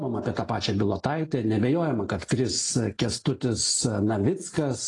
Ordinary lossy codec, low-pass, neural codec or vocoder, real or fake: AAC, 32 kbps; 10.8 kHz; none; real